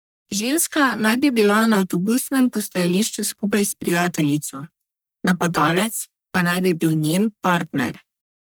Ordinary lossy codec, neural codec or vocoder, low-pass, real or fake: none; codec, 44.1 kHz, 1.7 kbps, Pupu-Codec; none; fake